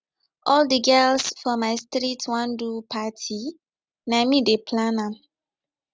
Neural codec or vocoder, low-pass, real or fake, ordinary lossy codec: none; none; real; none